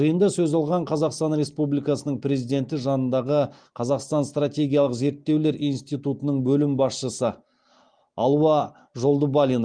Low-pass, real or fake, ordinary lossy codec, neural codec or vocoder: 9.9 kHz; real; Opus, 24 kbps; none